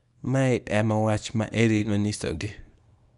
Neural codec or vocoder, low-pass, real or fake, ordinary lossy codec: codec, 24 kHz, 0.9 kbps, WavTokenizer, small release; 10.8 kHz; fake; none